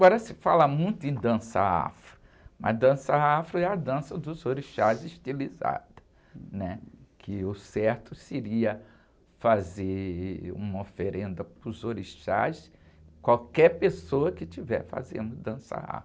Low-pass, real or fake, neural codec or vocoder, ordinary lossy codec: none; real; none; none